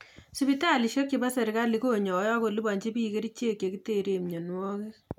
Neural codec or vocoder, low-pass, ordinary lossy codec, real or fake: none; 19.8 kHz; none; real